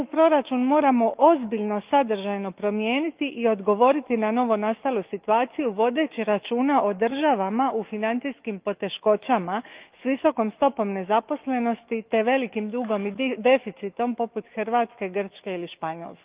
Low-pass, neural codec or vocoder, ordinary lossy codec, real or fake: 3.6 kHz; autoencoder, 48 kHz, 128 numbers a frame, DAC-VAE, trained on Japanese speech; Opus, 32 kbps; fake